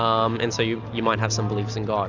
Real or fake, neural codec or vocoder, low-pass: real; none; 7.2 kHz